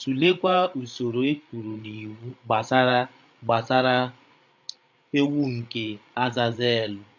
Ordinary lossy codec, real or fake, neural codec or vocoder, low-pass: none; fake; codec, 16 kHz, 8 kbps, FreqCodec, larger model; 7.2 kHz